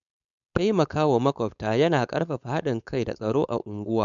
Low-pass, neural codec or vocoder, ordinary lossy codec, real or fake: 7.2 kHz; codec, 16 kHz, 4.8 kbps, FACodec; none; fake